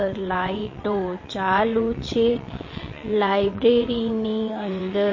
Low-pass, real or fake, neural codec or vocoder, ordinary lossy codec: 7.2 kHz; fake; vocoder, 22.05 kHz, 80 mel bands, WaveNeXt; MP3, 32 kbps